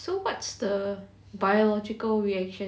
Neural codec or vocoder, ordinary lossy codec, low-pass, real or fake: none; none; none; real